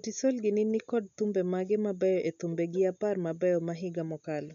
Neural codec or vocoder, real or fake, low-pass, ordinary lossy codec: none; real; 7.2 kHz; none